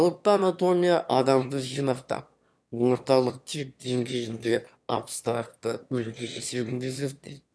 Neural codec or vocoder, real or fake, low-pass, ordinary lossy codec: autoencoder, 22.05 kHz, a latent of 192 numbers a frame, VITS, trained on one speaker; fake; none; none